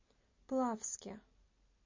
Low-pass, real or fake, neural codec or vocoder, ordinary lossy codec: 7.2 kHz; real; none; MP3, 32 kbps